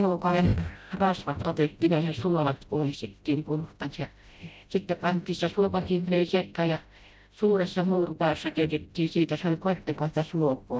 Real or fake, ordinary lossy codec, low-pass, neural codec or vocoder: fake; none; none; codec, 16 kHz, 0.5 kbps, FreqCodec, smaller model